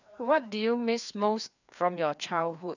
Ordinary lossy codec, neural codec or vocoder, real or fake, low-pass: none; codec, 16 kHz, 2 kbps, FreqCodec, larger model; fake; 7.2 kHz